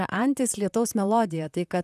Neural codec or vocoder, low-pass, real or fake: vocoder, 44.1 kHz, 128 mel bands, Pupu-Vocoder; 14.4 kHz; fake